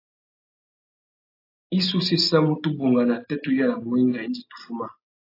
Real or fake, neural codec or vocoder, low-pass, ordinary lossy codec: real; none; 5.4 kHz; AAC, 32 kbps